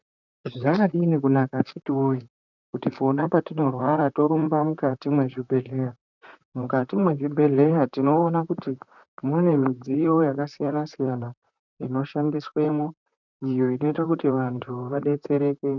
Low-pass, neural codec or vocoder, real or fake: 7.2 kHz; vocoder, 44.1 kHz, 128 mel bands, Pupu-Vocoder; fake